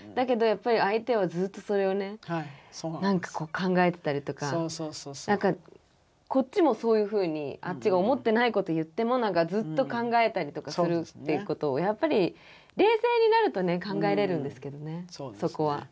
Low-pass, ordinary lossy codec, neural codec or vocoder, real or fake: none; none; none; real